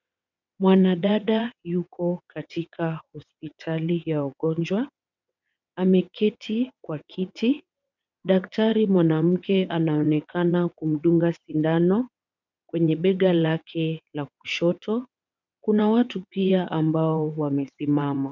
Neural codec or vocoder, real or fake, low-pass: vocoder, 22.05 kHz, 80 mel bands, WaveNeXt; fake; 7.2 kHz